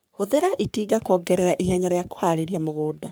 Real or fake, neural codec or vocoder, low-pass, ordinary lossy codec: fake; codec, 44.1 kHz, 3.4 kbps, Pupu-Codec; none; none